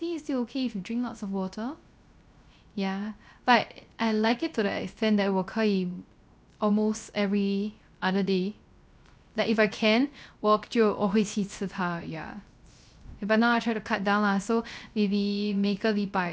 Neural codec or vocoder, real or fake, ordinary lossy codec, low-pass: codec, 16 kHz, 0.3 kbps, FocalCodec; fake; none; none